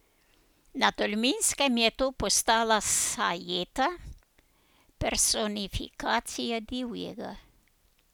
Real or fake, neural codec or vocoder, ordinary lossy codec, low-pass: real; none; none; none